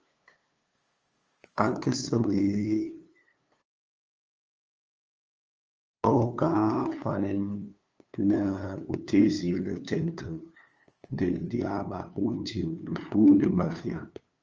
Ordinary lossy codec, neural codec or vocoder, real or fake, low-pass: Opus, 24 kbps; codec, 16 kHz, 2 kbps, FunCodec, trained on LibriTTS, 25 frames a second; fake; 7.2 kHz